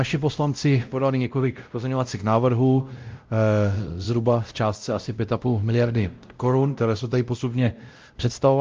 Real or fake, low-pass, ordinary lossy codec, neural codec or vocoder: fake; 7.2 kHz; Opus, 24 kbps; codec, 16 kHz, 0.5 kbps, X-Codec, WavLM features, trained on Multilingual LibriSpeech